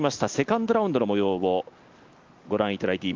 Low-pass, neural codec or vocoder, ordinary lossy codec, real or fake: 7.2 kHz; none; Opus, 32 kbps; real